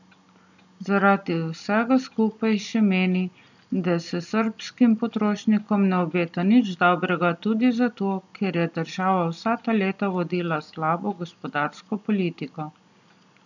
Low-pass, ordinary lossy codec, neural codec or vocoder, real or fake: 7.2 kHz; none; none; real